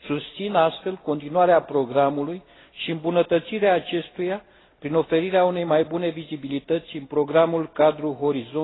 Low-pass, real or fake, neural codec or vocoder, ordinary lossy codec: 7.2 kHz; real; none; AAC, 16 kbps